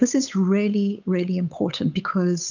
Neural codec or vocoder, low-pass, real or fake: codec, 16 kHz, 8 kbps, FunCodec, trained on Chinese and English, 25 frames a second; 7.2 kHz; fake